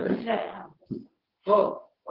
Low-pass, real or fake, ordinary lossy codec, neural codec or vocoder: 5.4 kHz; fake; Opus, 16 kbps; vocoder, 22.05 kHz, 80 mel bands, WaveNeXt